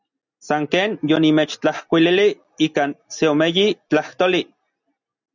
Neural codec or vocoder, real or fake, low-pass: none; real; 7.2 kHz